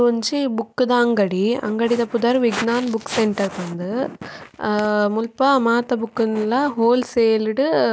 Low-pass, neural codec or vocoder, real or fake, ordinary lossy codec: none; none; real; none